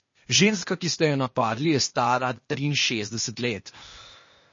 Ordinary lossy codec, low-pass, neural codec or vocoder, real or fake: MP3, 32 kbps; 7.2 kHz; codec, 16 kHz, 0.8 kbps, ZipCodec; fake